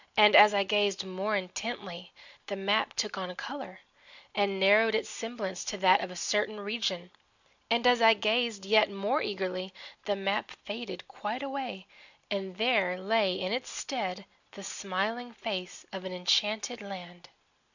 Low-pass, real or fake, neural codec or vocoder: 7.2 kHz; real; none